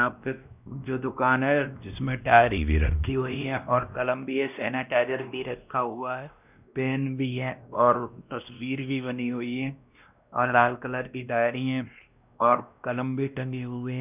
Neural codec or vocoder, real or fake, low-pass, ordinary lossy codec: codec, 16 kHz, 1 kbps, X-Codec, WavLM features, trained on Multilingual LibriSpeech; fake; 3.6 kHz; none